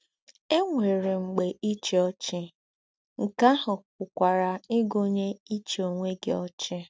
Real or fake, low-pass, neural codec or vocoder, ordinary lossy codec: real; none; none; none